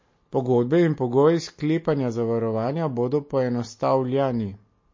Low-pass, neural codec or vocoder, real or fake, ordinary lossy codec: 7.2 kHz; none; real; MP3, 32 kbps